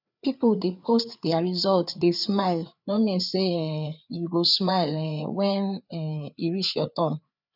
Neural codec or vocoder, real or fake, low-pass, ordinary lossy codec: codec, 16 kHz, 4 kbps, FreqCodec, larger model; fake; 5.4 kHz; none